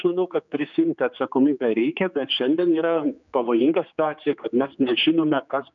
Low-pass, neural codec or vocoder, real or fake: 7.2 kHz; codec, 16 kHz, 4 kbps, X-Codec, HuBERT features, trained on general audio; fake